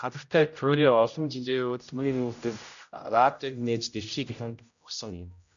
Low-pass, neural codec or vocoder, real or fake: 7.2 kHz; codec, 16 kHz, 0.5 kbps, X-Codec, HuBERT features, trained on general audio; fake